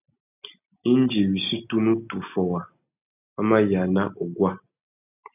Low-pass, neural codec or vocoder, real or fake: 3.6 kHz; none; real